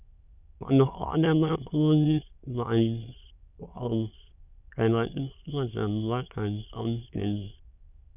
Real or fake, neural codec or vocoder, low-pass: fake; autoencoder, 22.05 kHz, a latent of 192 numbers a frame, VITS, trained on many speakers; 3.6 kHz